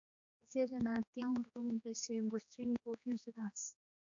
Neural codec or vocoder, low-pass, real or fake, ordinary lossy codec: codec, 16 kHz, 2 kbps, X-Codec, HuBERT features, trained on general audio; 7.2 kHz; fake; AAC, 64 kbps